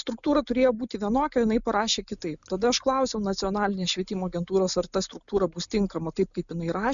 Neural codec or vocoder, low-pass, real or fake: none; 7.2 kHz; real